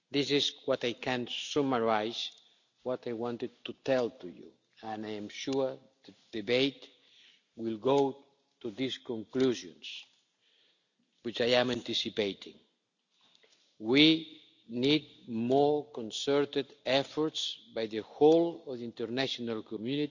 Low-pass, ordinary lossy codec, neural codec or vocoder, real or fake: 7.2 kHz; none; none; real